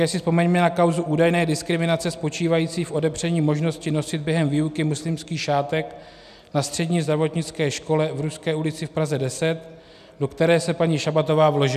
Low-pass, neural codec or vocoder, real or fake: 14.4 kHz; none; real